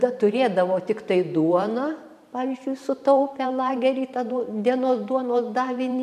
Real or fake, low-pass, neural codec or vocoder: fake; 14.4 kHz; vocoder, 44.1 kHz, 128 mel bands every 512 samples, BigVGAN v2